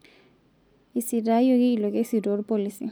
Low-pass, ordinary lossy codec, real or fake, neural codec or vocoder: none; none; real; none